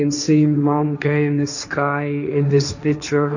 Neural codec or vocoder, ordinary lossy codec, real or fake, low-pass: codec, 16 kHz, 1.1 kbps, Voila-Tokenizer; none; fake; 7.2 kHz